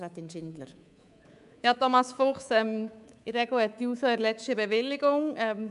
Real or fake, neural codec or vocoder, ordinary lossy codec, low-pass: fake; codec, 24 kHz, 3.1 kbps, DualCodec; none; 10.8 kHz